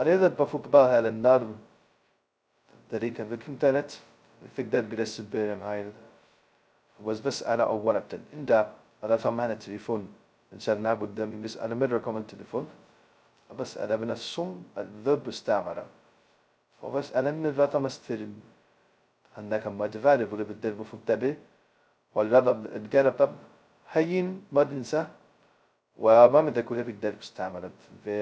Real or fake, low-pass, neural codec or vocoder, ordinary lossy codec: fake; none; codec, 16 kHz, 0.2 kbps, FocalCodec; none